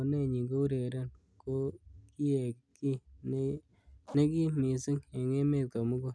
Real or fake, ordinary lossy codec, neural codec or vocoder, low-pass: real; none; none; none